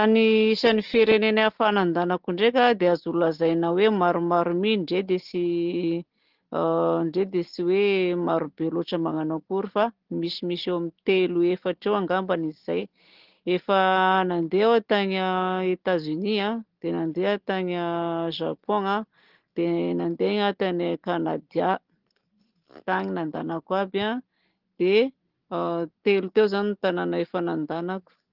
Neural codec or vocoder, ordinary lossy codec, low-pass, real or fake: none; Opus, 16 kbps; 5.4 kHz; real